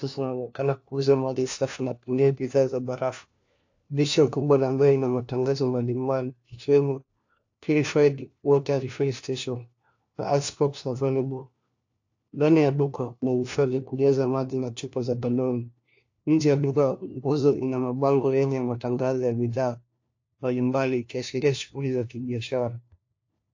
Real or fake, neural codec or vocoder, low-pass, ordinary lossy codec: fake; codec, 16 kHz, 1 kbps, FunCodec, trained on LibriTTS, 50 frames a second; 7.2 kHz; AAC, 48 kbps